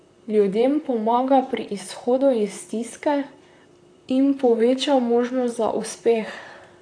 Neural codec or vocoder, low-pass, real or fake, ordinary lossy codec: vocoder, 44.1 kHz, 128 mel bands, Pupu-Vocoder; 9.9 kHz; fake; AAC, 48 kbps